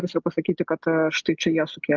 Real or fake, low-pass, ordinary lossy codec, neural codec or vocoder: real; 7.2 kHz; Opus, 24 kbps; none